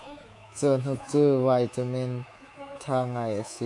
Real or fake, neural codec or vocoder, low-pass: fake; codec, 24 kHz, 3.1 kbps, DualCodec; 10.8 kHz